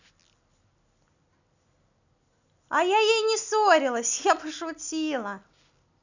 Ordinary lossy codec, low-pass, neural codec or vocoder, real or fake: none; 7.2 kHz; none; real